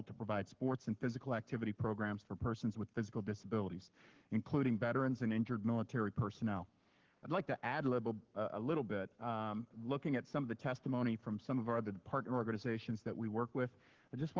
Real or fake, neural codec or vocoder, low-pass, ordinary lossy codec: fake; codec, 44.1 kHz, 7.8 kbps, Pupu-Codec; 7.2 kHz; Opus, 16 kbps